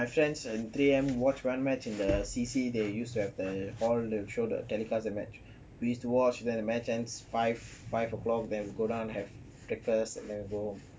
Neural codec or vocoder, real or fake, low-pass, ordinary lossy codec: none; real; none; none